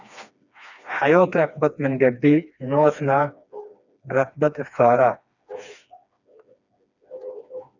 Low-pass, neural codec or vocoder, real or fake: 7.2 kHz; codec, 16 kHz, 2 kbps, FreqCodec, smaller model; fake